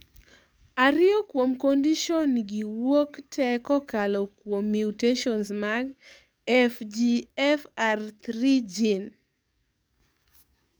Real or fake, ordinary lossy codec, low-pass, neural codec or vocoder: fake; none; none; codec, 44.1 kHz, 7.8 kbps, DAC